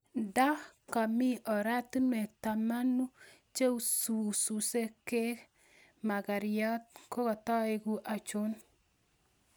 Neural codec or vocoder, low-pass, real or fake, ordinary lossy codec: none; none; real; none